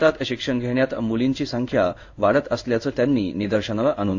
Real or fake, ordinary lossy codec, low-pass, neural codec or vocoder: fake; AAC, 48 kbps; 7.2 kHz; codec, 16 kHz in and 24 kHz out, 1 kbps, XY-Tokenizer